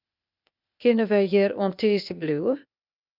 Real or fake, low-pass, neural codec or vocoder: fake; 5.4 kHz; codec, 16 kHz, 0.8 kbps, ZipCodec